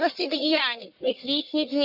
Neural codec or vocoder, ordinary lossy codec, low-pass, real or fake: codec, 44.1 kHz, 1.7 kbps, Pupu-Codec; none; 5.4 kHz; fake